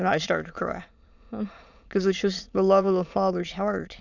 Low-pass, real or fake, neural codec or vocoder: 7.2 kHz; fake; autoencoder, 22.05 kHz, a latent of 192 numbers a frame, VITS, trained on many speakers